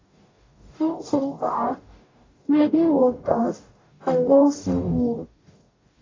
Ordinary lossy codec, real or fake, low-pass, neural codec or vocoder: AAC, 32 kbps; fake; 7.2 kHz; codec, 44.1 kHz, 0.9 kbps, DAC